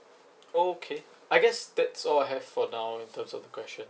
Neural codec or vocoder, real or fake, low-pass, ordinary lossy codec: none; real; none; none